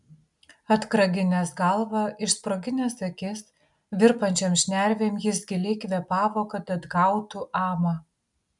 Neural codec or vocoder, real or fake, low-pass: none; real; 10.8 kHz